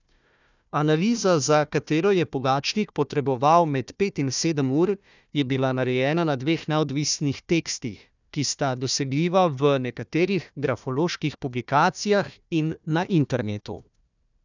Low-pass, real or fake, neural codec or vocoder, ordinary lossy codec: 7.2 kHz; fake; codec, 16 kHz, 1 kbps, FunCodec, trained on Chinese and English, 50 frames a second; none